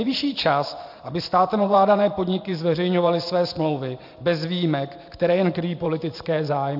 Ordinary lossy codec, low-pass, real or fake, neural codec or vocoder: AAC, 48 kbps; 5.4 kHz; fake; vocoder, 44.1 kHz, 128 mel bands every 512 samples, BigVGAN v2